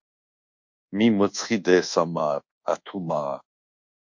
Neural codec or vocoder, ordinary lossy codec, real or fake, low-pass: codec, 24 kHz, 1.2 kbps, DualCodec; MP3, 48 kbps; fake; 7.2 kHz